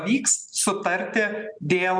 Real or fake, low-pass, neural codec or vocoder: real; 9.9 kHz; none